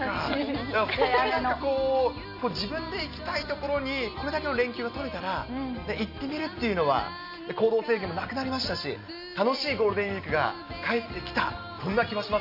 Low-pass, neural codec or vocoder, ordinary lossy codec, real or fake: 5.4 kHz; none; none; real